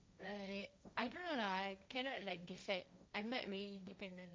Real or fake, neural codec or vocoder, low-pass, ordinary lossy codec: fake; codec, 16 kHz, 1.1 kbps, Voila-Tokenizer; none; none